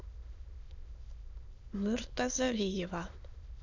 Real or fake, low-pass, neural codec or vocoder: fake; 7.2 kHz; autoencoder, 22.05 kHz, a latent of 192 numbers a frame, VITS, trained on many speakers